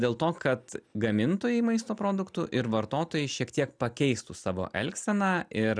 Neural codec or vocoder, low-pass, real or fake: none; 9.9 kHz; real